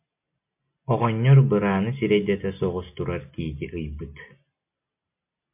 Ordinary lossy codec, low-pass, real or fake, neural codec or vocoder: MP3, 32 kbps; 3.6 kHz; real; none